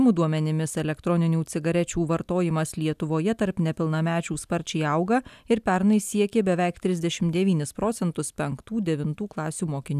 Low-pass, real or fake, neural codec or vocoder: 14.4 kHz; real; none